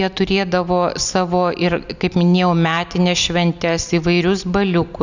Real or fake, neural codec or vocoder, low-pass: real; none; 7.2 kHz